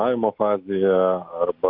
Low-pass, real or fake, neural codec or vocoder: 5.4 kHz; real; none